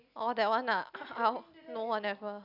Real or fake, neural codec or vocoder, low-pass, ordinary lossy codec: real; none; 5.4 kHz; none